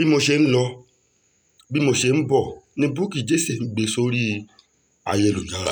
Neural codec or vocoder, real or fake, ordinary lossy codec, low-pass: none; real; none; none